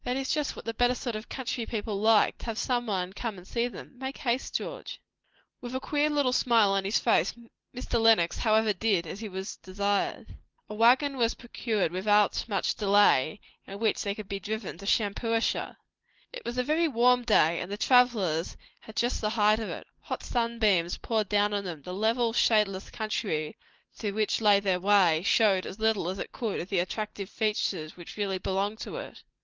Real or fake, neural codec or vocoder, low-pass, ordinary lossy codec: real; none; 7.2 kHz; Opus, 16 kbps